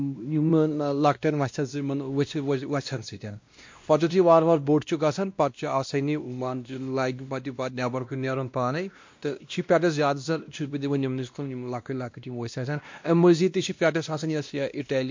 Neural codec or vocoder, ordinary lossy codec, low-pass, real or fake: codec, 16 kHz, 1 kbps, X-Codec, WavLM features, trained on Multilingual LibriSpeech; MP3, 48 kbps; 7.2 kHz; fake